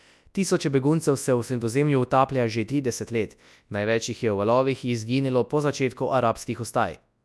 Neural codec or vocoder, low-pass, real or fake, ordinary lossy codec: codec, 24 kHz, 0.9 kbps, WavTokenizer, large speech release; none; fake; none